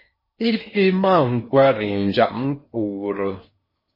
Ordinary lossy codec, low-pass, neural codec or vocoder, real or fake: MP3, 24 kbps; 5.4 kHz; codec, 16 kHz in and 24 kHz out, 0.8 kbps, FocalCodec, streaming, 65536 codes; fake